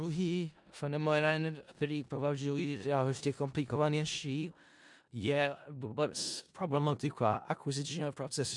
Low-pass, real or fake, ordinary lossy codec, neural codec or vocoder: 10.8 kHz; fake; MP3, 64 kbps; codec, 16 kHz in and 24 kHz out, 0.4 kbps, LongCat-Audio-Codec, four codebook decoder